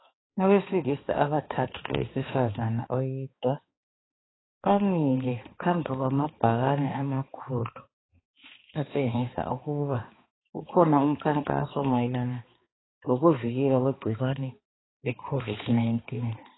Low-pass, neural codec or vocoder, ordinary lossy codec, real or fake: 7.2 kHz; codec, 16 kHz, 2 kbps, X-Codec, HuBERT features, trained on balanced general audio; AAC, 16 kbps; fake